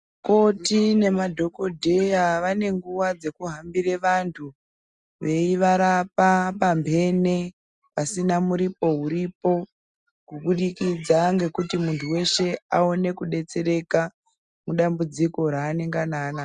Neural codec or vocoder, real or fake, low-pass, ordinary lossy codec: none; real; 10.8 kHz; Opus, 64 kbps